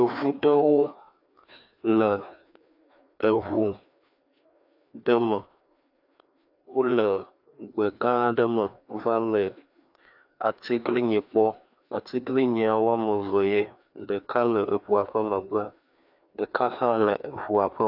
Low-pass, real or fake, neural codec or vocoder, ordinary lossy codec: 5.4 kHz; fake; codec, 16 kHz, 2 kbps, FreqCodec, larger model; AAC, 48 kbps